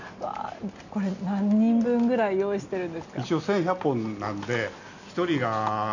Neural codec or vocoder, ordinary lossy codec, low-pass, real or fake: none; none; 7.2 kHz; real